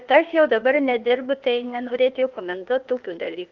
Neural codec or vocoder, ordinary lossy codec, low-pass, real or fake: codec, 16 kHz, 0.8 kbps, ZipCodec; Opus, 24 kbps; 7.2 kHz; fake